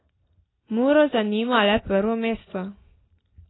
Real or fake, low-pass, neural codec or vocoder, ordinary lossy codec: real; 7.2 kHz; none; AAC, 16 kbps